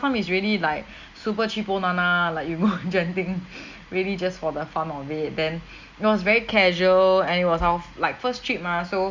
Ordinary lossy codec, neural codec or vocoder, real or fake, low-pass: Opus, 64 kbps; none; real; 7.2 kHz